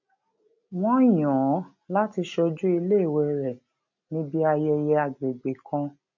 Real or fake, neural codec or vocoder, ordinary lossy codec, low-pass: real; none; none; 7.2 kHz